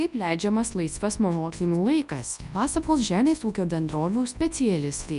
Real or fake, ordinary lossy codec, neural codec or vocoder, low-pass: fake; MP3, 96 kbps; codec, 24 kHz, 0.9 kbps, WavTokenizer, large speech release; 10.8 kHz